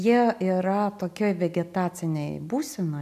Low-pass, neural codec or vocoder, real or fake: 14.4 kHz; none; real